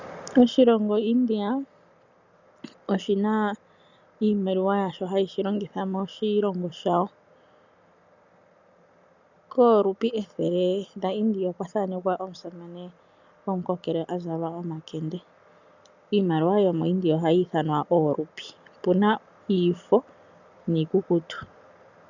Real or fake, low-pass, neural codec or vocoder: real; 7.2 kHz; none